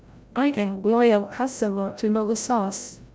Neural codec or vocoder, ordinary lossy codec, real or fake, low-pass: codec, 16 kHz, 0.5 kbps, FreqCodec, larger model; none; fake; none